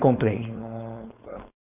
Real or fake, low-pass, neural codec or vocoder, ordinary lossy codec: fake; 3.6 kHz; codec, 16 kHz, 4.8 kbps, FACodec; none